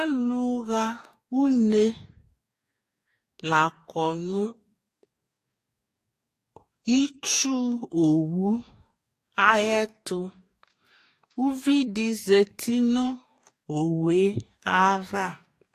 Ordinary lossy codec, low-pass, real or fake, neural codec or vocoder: AAC, 64 kbps; 14.4 kHz; fake; codec, 44.1 kHz, 2.6 kbps, DAC